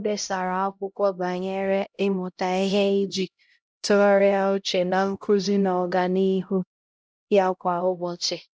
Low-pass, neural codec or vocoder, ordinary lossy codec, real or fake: none; codec, 16 kHz, 0.5 kbps, X-Codec, HuBERT features, trained on LibriSpeech; none; fake